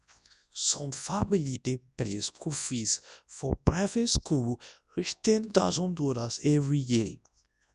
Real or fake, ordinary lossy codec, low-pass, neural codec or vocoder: fake; none; 10.8 kHz; codec, 24 kHz, 0.9 kbps, WavTokenizer, large speech release